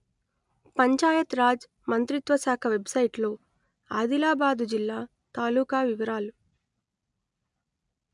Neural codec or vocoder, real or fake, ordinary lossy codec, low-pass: none; real; MP3, 96 kbps; 10.8 kHz